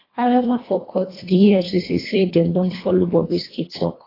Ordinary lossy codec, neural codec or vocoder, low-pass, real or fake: AAC, 24 kbps; codec, 24 kHz, 1.5 kbps, HILCodec; 5.4 kHz; fake